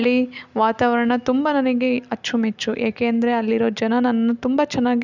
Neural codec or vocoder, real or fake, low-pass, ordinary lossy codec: none; real; 7.2 kHz; none